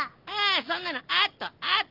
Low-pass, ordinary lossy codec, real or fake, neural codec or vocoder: 5.4 kHz; Opus, 24 kbps; real; none